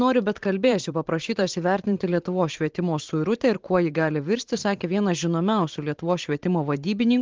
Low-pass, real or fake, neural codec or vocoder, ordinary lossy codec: 7.2 kHz; fake; vocoder, 44.1 kHz, 128 mel bands every 512 samples, BigVGAN v2; Opus, 16 kbps